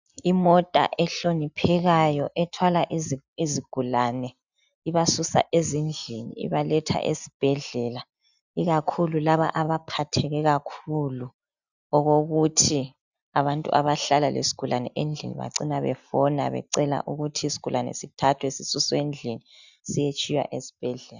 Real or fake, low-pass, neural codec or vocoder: real; 7.2 kHz; none